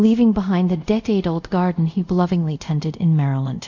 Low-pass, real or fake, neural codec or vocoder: 7.2 kHz; fake; codec, 24 kHz, 0.5 kbps, DualCodec